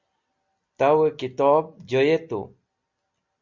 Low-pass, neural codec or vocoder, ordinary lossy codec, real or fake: 7.2 kHz; none; Opus, 64 kbps; real